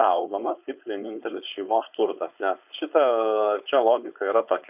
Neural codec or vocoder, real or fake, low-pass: codec, 16 kHz, 4.8 kbps, FACodec; fake; 3.6 kHz